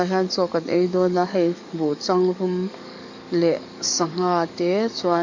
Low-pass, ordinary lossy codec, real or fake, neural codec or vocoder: 7.2 kHz; none; fake; codec, 44.1 kHz, 7.8 kbps, DAC